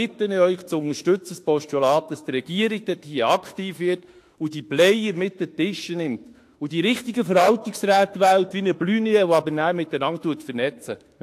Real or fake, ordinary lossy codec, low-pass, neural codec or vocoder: fake; AAC, 64 kbps; 14.4 kHz; autoencoder, 48 kHz, 32 numbers a frame, DAC-VAE, trained on Japanese speech